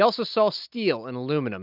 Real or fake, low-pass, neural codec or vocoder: real; 5.4 kHz; none